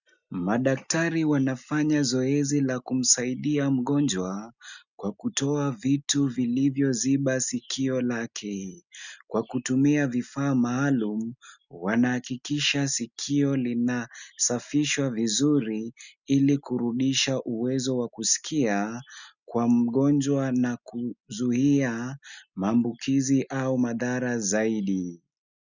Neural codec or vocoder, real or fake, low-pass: none; real; 7.2 kHz